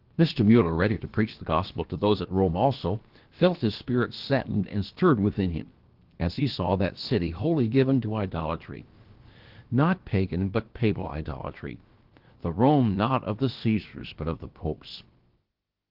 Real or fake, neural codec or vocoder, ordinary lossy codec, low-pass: fake; codec, 16 kHz, about 1 kbps, DyCAST, with the encoder's durations; Opus, 16 kbps; 5.4 kHz